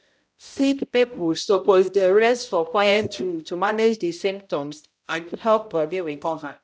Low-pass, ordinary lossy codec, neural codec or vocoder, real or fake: none; none; codec, 16 kHz, 0.5 kbps, X-Codec, HuBERT features, trained on balanced general audio; fake